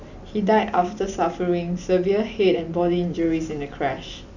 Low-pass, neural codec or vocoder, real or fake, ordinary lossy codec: 7.2 kHz; none; real; none